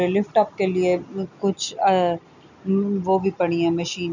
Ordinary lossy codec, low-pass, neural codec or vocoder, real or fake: none; 7.2 kHz; none; real